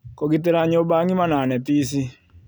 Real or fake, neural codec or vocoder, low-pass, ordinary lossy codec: real; none; none; none